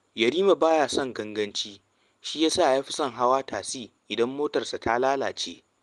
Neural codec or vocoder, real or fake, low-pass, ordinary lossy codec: none; real; 10.8 kHz; Opus, 24 kbps